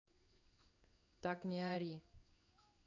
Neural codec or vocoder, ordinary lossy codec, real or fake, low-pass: codec, 16 kHz in and 24 kHz out, 1 kbps, XY-Tokenizer; none; fake; 7.2 kHz